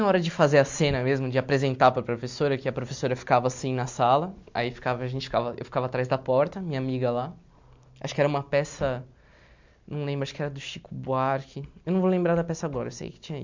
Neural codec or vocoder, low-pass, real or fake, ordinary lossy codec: none; 7.2 kHz; real; MP3, 64 kbps